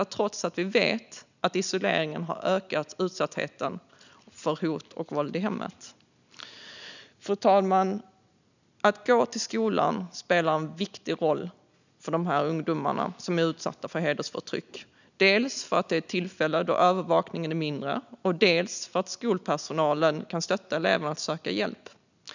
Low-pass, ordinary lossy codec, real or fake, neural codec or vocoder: 7.2 kHz; none; real; none